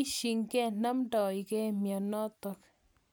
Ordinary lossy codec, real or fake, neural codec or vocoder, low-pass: none; real; none; none